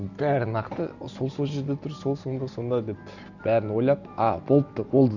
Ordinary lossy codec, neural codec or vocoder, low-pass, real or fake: none; none; 7.2 kHz; real